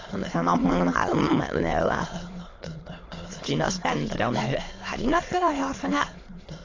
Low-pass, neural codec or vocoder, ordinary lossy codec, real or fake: 7.2 kHz; autoencoder, 22.05 kHz, a latent of 192 numbers a frame, VITS, trained on many speakers; AAC, 32 kbps; fake